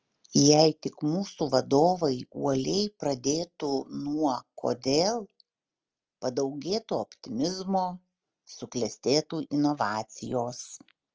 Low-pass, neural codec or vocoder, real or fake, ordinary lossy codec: 7.2 kHz; none; real; Opus, 24 kbps